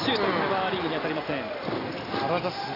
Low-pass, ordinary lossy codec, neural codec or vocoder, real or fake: 5.4 kHz; AAC, 24 kbps; none; real